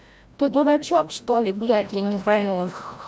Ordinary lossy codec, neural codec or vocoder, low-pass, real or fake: none; codec, 16 kHz, 0.5 kbps, FreqCodec, larger model; none; fake